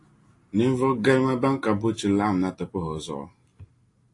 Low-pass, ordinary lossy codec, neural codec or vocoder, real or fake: 10.8 kHz; AAC, 48 kbps; none; real